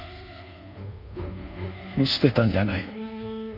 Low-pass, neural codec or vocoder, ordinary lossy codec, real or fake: 5.4 kHz; codec, 24 kHz, 1.2 kbps, DualCodec; none; fake